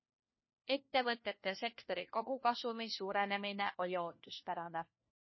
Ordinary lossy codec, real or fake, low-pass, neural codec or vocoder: MP3, 24 kbps; fake; 7.2 kHz; codec, 16 kHz, 1 kbps, FunCodec, trained on LibriTTS, 50 frames a second